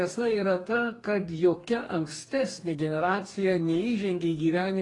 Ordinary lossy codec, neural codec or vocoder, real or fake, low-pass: AAC, 32 kbps; codec, 44.1 kHz, 2.6 kbps, DAC; fake; 10.8 kHz